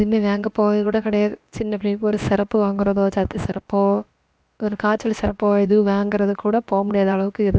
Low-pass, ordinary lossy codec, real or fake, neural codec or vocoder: none; none; fake; codec, 16 kHz, about 1 kbps, DyCAST, with the encoder's durations